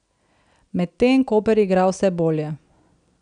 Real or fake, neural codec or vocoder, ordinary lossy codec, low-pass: real; none; none; 9.9 kHz